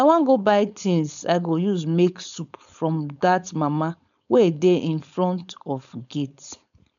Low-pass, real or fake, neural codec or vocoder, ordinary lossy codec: 7.2 kHz; fake; codec, 16 kHz, 4.8 kbps, FACodec; none